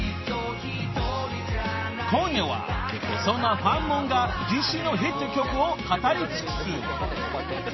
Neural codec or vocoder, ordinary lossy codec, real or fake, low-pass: none; MP3, 24 kbps; real; 7.2 kHz